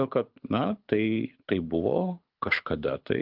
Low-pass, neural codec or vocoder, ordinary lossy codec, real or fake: 5.4 kHz; codec, 24 kHz, 6 kbps, HILCodec; Opus, 24 kbps; fake